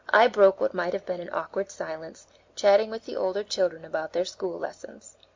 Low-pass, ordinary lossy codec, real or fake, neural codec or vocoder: 7.2 kHz; MP3, 64 kbps; real; none